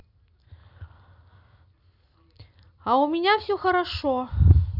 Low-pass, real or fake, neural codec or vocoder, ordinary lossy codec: 5.4 kHz; real; none; none